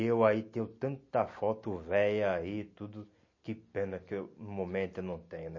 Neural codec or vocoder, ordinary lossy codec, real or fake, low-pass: none; MP3, 32 kbps; real; 7.2 kHz